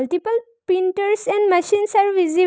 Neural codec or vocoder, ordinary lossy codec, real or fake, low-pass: none; none; real; none